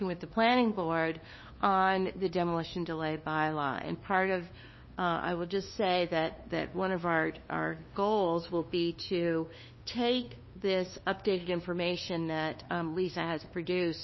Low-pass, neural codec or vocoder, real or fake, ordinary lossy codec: 7.2 kHz; codec, 16 kHz, 2 kbps, FunCodec, trained on LibriTTS, 25 frames a second; fake; MP3, 24 kbps